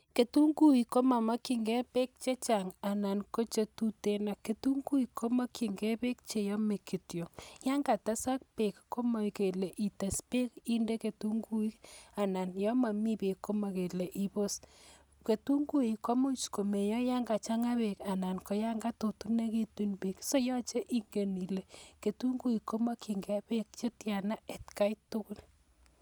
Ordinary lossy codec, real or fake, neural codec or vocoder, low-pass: none; real; none; none